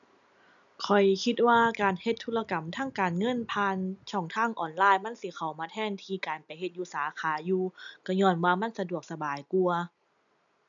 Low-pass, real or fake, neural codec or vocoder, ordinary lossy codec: 7.2 kHz; real; none; none